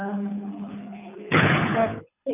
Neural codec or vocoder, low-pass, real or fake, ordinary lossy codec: codec, 24 kHz, 6 kbps, HILCodec; 3.6 kHz; fake; none